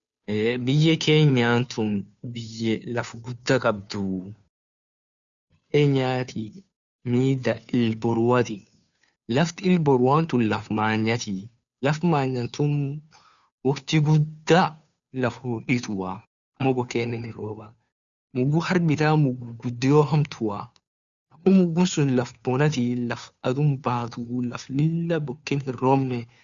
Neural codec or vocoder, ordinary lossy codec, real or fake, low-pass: codec, 16 kHz, 2 kbps, FunCodec, trained on Chinese and English, 25 frames a second; none; fake; 7.2 kHz